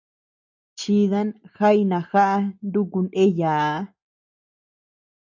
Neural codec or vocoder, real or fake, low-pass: none; real; 7.2 kHz